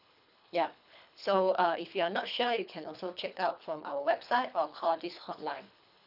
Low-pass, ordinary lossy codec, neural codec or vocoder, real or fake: 5.4 kHz; none; codec, 24 kHz, 3 kbps, HILCodec; fake